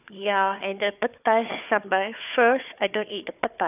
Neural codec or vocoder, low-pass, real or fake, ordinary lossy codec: codec, 16 kHz, 4 kbps, FunCodec, trained on Chinese and English, 50 frames a second; 3.6 kHz; fake; none